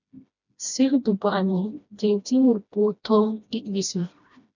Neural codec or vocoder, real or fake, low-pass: codec, 16 kHz, 1 kbps, FreqCodec, smaller model; fake; 7.2 kHz